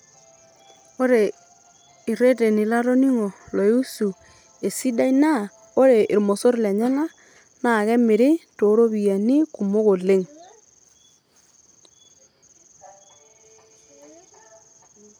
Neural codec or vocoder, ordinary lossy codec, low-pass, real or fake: none; none; none; real